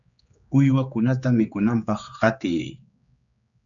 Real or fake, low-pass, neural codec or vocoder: fake; 7.2 kHz; codec, 16 kHz, 4 kbps, X-Codec, HuBERT features, trained on general audio